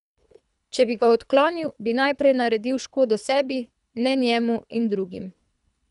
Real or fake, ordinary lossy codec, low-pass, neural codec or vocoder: fake; none; 10.8 kHz; codec, 24 kHz, 3 kbps, HILCodec